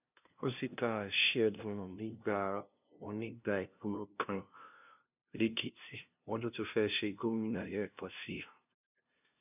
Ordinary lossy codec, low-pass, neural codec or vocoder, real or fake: none; 3.6 kHz; codec, 16 kHz, 0.5 kbps, FunCodec, trained on LibriTTS, 25 frames a second; fake